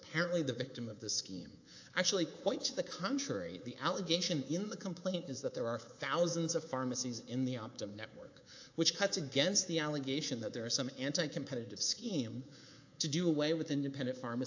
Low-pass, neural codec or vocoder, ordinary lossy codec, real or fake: 7.2 kHz; codec, 24 kHz, 3.1 kbps, DualCodec; AAC, 48 kbps; fake